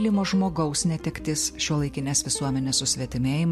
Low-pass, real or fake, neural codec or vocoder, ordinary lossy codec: 14.4 kHz; real; none; MP3, 64 kbps